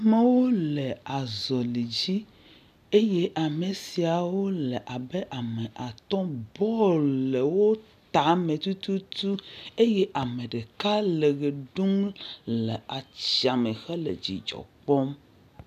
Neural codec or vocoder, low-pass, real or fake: none; 14.4 kHz; real